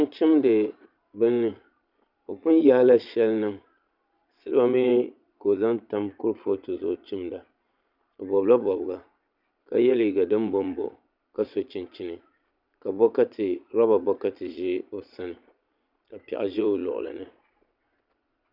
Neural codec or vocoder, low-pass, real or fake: vocoder, 22.05 kHz, 80 mel bands, Vocos; 5.4 kHz; fake